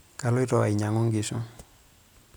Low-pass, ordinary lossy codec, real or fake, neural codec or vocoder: none; none; real; none